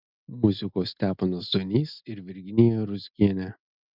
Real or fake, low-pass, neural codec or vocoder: real; 5.4 kHz; none